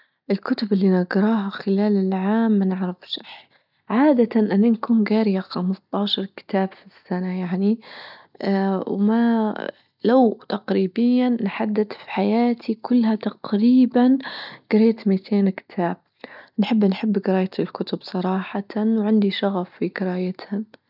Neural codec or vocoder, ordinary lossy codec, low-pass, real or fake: none; none; 5.4 kHz; real